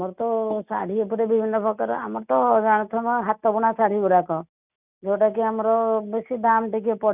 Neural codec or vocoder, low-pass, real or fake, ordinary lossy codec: none; 3.6 kHz; real; none